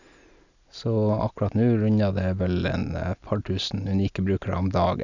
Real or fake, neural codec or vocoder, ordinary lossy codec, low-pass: real; none; none; 7.2 kHz